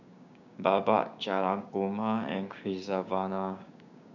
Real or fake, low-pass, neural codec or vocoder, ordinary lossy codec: fake; 7.2 kHz; codec, 16 kHz, 6 kbps, DAC; none